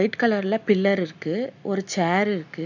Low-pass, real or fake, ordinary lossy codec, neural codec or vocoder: 7.2 kHz; real; none; none